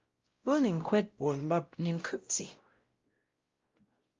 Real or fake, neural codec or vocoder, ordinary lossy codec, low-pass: fake; codec, 16 kHz, 0.5 kbps, X-Codec, WavLM features, trained on Multilingual LibriSpeech; Opus, 32 kbps; 7.2 kHz